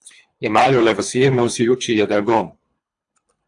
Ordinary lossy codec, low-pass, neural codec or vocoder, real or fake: AAC, 64 kbps; 10.8 kHz; codec, 24 kHz, 3 kbps, HILCodec; fake